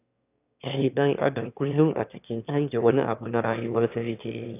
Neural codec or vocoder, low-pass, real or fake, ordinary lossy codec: autoencoder, 22.05 kHz, a latent of 192 numbers a frame, VITS, trained on one speaker; 3.6 kHz; fake; none